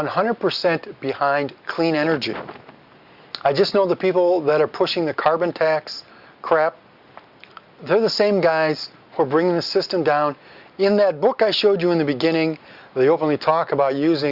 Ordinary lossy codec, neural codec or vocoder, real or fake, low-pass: Opus, 64 kbps; none; real; 5.4 kHz